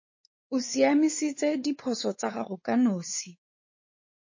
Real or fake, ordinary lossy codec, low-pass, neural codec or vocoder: fake; MP3, 32 kbps; 7.2 kHz; codec, 16 kHz, 4 kbps, X-Codec, WavLM features, trained on Multilingual LibriSpeech